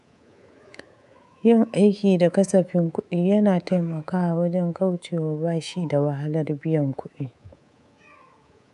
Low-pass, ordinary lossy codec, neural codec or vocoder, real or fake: 10.8 kHz; none; codec, 24 kHz, 3.1 kbps, DualCodec; fake